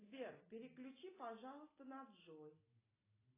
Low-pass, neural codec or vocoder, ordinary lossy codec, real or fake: 3.6 kHz; none; AAC, 24 kbps; real